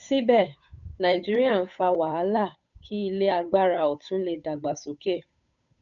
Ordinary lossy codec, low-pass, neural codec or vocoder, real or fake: none; 7.2 kHz; codec, 16 kHz, 8 kbps, FunCodec, trained on Chinese and English, 25 frames a second; fake